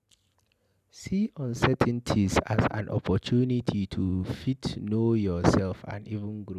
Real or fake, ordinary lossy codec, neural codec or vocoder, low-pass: real; none; none; 14.4 kHz